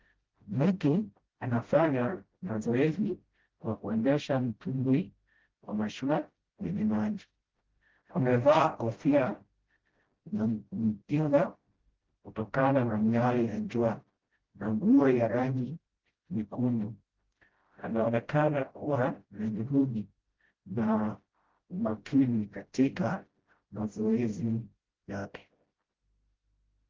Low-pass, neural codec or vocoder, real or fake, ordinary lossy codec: 7.2 kHz; codec, 16 kHz, 0.5 kbps, FreqCodec, smaller model; fake; Opus, 16 kbps